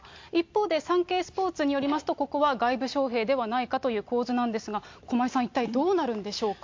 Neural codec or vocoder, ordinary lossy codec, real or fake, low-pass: none; MP3, 48 kbps; real; 7.2 kHz